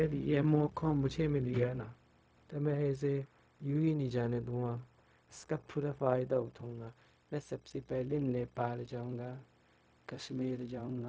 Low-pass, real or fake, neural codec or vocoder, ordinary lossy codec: none; fake; codec, 16 kHz, 0.4 kbps, LongCat-Audio-Codec; none